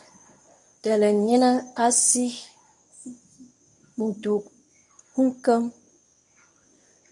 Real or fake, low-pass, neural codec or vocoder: fake; 10.8 kHz; codec, 24 kHz, 0.9 kbps, WavTokenizer, medium speech release version 1